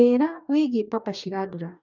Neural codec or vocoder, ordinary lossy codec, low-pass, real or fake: codec, 44.1 kHz, 2.6 kbps, DAC; none; 7.2 kHz; fake